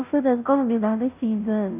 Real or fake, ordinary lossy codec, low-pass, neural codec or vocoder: fake; none; 3.6 kHz; codec, 16 kHz, 0.5 kbps, FunCodec, trained on Chinese and English, 25 frames a second